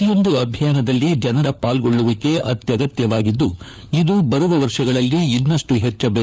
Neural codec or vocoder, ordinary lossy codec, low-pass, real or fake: codec, 16 kHz, 4 kbps, FunCodec, trained on LibriTTS, 50 frames a second; none; none; fake